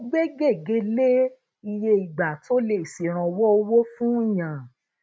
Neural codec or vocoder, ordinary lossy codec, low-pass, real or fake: none; none; none; real